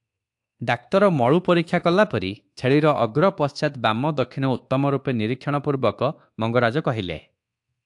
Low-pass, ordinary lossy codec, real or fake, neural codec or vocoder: 10.8 kHz; AAC, 64 kbps; fake; codec, 24 kHz, 1.2 kbps, DualCodec